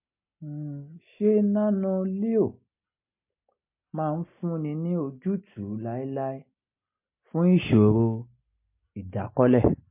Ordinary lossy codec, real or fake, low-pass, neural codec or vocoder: MP3, 24 kbps; real; 3.6 kHz; none